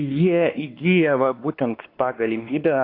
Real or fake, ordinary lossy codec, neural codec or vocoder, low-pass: fake; AAC, 32 kbps; codec, 16 kHz, 2 kbps, X-Codec, WavLM features, trained on Multilingual LibriSpeech; 5.4 kHz